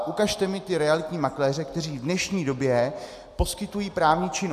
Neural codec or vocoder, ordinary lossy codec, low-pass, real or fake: none; AAC, 96 kbps; 14.4 kHz; real